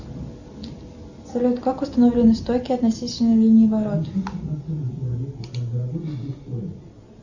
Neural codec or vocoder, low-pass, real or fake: none; 7.2 kHz; real